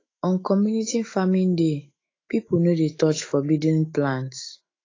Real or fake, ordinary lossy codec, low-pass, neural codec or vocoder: fake; AAC, 32 kbps; 7.2 kHz; autoencoder, 48 kHz, 128 numbers a frame, DAC-VAE, trained on Japanese speech